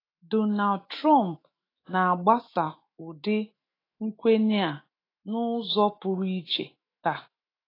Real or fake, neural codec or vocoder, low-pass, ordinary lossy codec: fake; autoencoder, 48 kHz, 128 numbers a frame, DAC-VAE, trained on Japanese speech; 5.4 kHz; AAC, 24 kbps